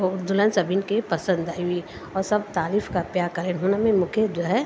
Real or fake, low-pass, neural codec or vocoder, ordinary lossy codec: real; none; none; none